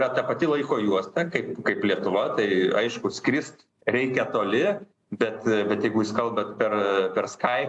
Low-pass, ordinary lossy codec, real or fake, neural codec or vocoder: 10.8 kHz; AAC, 64 kbps; real; none